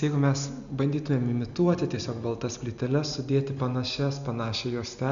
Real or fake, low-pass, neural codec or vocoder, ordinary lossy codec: real; 7.2 kHz; none; MP3, 96 kbps